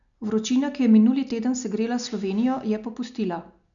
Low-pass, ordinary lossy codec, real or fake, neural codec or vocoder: 7.2 kHz; none; real; none